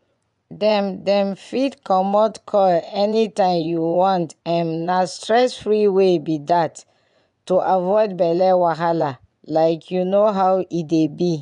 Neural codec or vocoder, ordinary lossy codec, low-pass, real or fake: vocoder, 24 kHz, 100 mel bands, Vocos; none; 10.8 kHz; fake